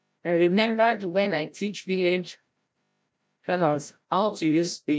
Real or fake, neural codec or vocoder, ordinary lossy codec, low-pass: fake; codec, 16 kHz, 0.5 kbps, FreqCodec, larger model; none; none